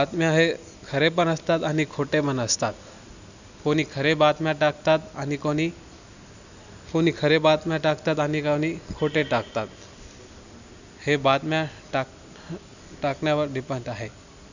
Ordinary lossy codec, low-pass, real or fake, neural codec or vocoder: none; 7.2 kHz; real; none